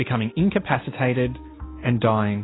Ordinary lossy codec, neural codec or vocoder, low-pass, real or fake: AAC, 16 kbps; none; 7.2 kHz; real